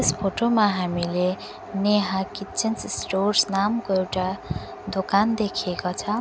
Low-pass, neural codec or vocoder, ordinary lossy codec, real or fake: none; none; none; real